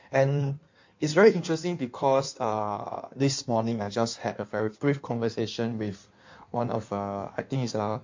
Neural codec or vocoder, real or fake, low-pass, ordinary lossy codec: codec, 16 kHz in and 24 kHz out, 1.1 kbps, FireRedTTS-2 codec; fake; 7.2 kHz; MP3, 48 kbps